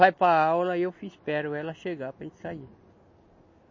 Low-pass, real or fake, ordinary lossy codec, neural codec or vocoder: 7.2 kHz; real; MP3, 32 kbps; none